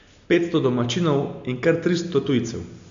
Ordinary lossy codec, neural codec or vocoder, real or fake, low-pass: AAC, 64 kbps; none; real; 7.2 kHz